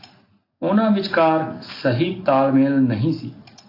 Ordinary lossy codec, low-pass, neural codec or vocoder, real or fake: AAC, 32 kbps; 5.4 kHz; none; real